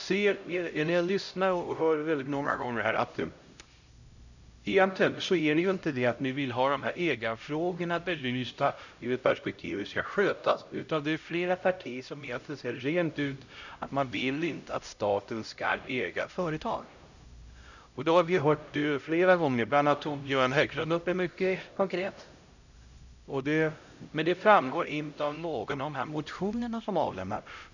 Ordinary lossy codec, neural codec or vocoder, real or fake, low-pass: none; codec, 16 kHz, 0.5 kbps, X-Codec, HuBERT features, trained on LibriSpeech; fake; 7.2 kHz